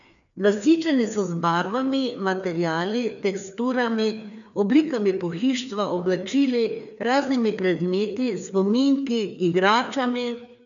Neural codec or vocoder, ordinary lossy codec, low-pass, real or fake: codec, 16 kHz, 2 kbps, FreqCodec, larger model; none; 7.2 kHz; fake